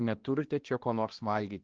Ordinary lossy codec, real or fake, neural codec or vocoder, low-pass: Opus, 16 kbps; fake; codec, 16 kHz, 1 kbps, X-Codec, HuBERT features, trained on balanced general audio; 7.2 kHz